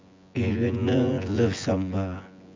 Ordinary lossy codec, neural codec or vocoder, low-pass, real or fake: MP3, 64 kbps; vocoder, 24 kHz, 100 mel bands, Vocos; 7.2 kHz; fake